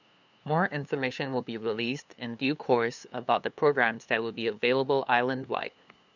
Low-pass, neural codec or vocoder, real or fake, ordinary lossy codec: 7.2 kHz; codec, 16 kHz, 2 kbps, FunCodec, trained on LibriTTS, 25 frames a second; fake; none